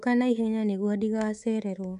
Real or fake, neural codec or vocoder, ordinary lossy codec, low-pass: fake; codec, 24 kHz, 3.1 kbps, DualCodec; MP3, 96 kbps; 10.8 kHz